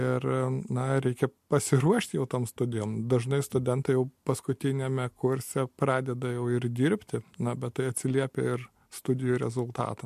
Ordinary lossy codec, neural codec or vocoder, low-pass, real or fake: MP3, 64 kbps; none; 14.4 kHz; real